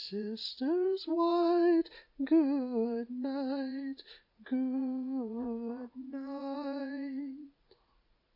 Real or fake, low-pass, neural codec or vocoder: fake; 5.4 kHz; vocoder, 44.1 kHz, 80 mel bands, Vocos